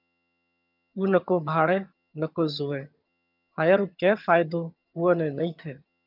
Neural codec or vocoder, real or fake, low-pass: vocoder, 22.05 kHz, 80 mel bands, HiFi-GAN; fake; 5.4 kHz